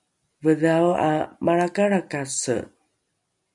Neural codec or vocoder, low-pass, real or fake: none; 10.8 kHz; real